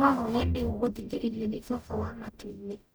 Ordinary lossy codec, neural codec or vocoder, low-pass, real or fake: none; codec, 44.1 kHz, 0.9 kbps, DAC; none; fake